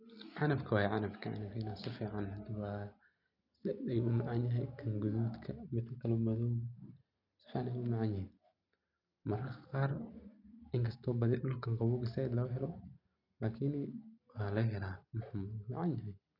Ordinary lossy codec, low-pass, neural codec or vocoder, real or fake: none; 5.4 kHz; none; real